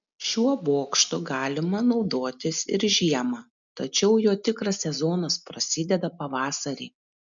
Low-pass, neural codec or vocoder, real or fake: 7.2 kHz; none; real